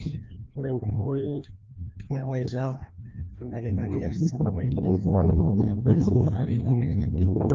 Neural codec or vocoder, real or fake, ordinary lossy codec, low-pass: codec, 16 kHz, 1 kbps, FreqCodec, larger model; fake; Opus, 32 kbps; 7.2 kHz